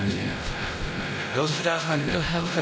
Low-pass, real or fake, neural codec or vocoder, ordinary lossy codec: none; fake; codec, 16 kHz, 0.5 kbps, X-Codec, WavLM features, trained on Multilingual LibriSpeech; none